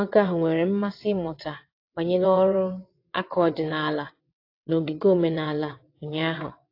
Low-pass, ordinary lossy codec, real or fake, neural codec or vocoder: 5.4 kHz; AAC, 32 kbps; fake; vocoder, 22.05 kHz, 80 mel bands, WaveNeXt